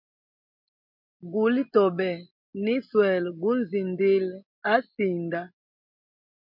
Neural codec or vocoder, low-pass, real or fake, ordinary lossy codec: none; 5.4 kHz; real; MP3, 48 kbps